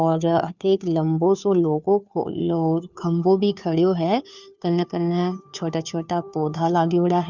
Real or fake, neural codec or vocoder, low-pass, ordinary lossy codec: fake; codec, 16 kHz, 2 kbps, FunCodec, trained on Chinese and English, 25 frames a second; 7.2 kHz; none